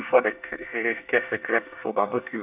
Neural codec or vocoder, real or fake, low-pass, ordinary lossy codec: codec, 24 kHz, 1 kbps, SNAC; fake; 3.6 kHz; none